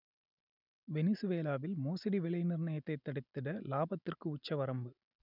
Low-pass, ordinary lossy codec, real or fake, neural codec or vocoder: 5.4 kHz; none; real; none